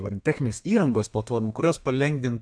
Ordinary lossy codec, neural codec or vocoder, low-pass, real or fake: AAC, 64 kbps; codec, 32 kHz, 1.9 kbps, SNAC; 9.9 kHz; fake